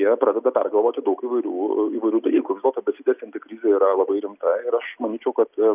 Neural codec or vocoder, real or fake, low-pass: none; real; 3.6 kHz